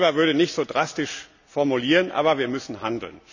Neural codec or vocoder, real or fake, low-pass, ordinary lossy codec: none; real; 7.2 kHz; none